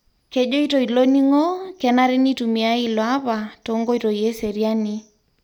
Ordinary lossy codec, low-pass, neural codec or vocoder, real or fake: MP3, 96 kbps; 19.8 kHz; none; real